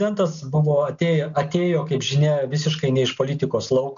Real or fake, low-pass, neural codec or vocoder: real; 7.2 kHz; none